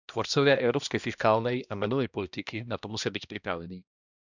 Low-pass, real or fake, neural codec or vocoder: 7.2 kHz; fake; codec, 16 kHz, 1 kbps, X-Codec, HuBERT features, trained on balanced general audio